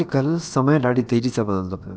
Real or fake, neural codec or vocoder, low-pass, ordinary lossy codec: fake; codec, 16 kHz, about 1 kbps, DyCAST, with the encoder's durations; none; none